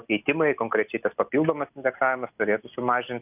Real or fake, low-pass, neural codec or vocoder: real; 3.6 kHz; none